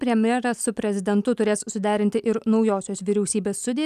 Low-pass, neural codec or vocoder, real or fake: 14.4 kHz; none; real